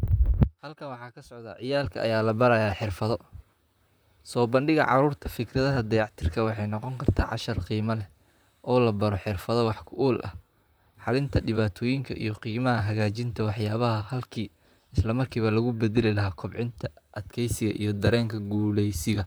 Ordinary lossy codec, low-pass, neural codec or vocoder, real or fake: none; none; vocoder, 44.1 kHz, 128 mel bands, Pupu-Vocoder; fake